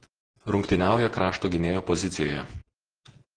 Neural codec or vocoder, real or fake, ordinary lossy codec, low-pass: vocoder, 48 kHz, 128 mel bands, Vocos; fake; Opus, 16 kbps; 9.9 kHz